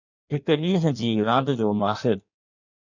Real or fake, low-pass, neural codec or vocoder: fake; 7.2 kHz; codec, 16 kHz in and 24 kHz out, 1.1 kbps, FireRedTTS-2 codec